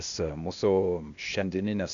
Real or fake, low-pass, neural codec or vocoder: fake; 7.2 kHz; codec, 16 kHz, 0.8 kbps, ZipCodec